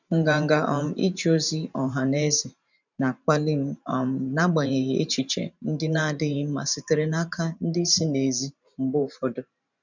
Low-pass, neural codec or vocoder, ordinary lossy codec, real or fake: 7.2 kHz; vocoder, 44.1 kHz, 128 mel bands every 512 samples, BigVGAN v2; none; fake